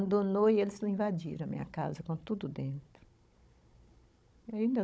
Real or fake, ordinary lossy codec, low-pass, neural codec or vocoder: fake; none; none; codec, 16 kHz, 16 kbps, FunCodec, trained on Chinese and English, 50 frames a second